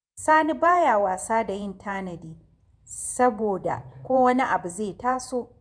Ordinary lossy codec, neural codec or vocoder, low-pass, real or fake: none; vocoder, 48 kHz, 128 mel bands, Vocos; 9.9 kHz; fake